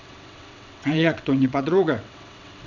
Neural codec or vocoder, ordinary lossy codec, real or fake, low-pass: vocoder, 22.05 kHz, 80 mel bands, WaveNeXt; MP3, 64 kbps; fake; 7.2 kHz